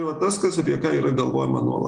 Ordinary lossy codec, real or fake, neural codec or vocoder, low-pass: Opus, 24 kbps; real; none; 9.9 kHz